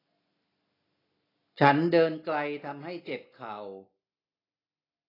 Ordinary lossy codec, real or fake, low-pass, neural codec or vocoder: AAC, 24 kbps; real; 5.4 kHz; none